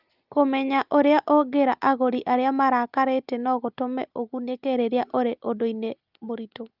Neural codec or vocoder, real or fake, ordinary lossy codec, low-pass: none; real; Opus, 24 kbps; 5.4 kHz